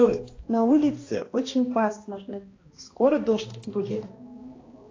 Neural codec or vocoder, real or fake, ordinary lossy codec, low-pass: codec, 16 kHz, 1 kbps, X-Codec, HuBERT features, trained on balanced general audio; fake; AAC, 32 kbps; 7.2 kHz